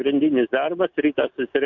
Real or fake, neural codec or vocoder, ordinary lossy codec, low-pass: real; none; MP3, 64 kbps; 7.2 kHz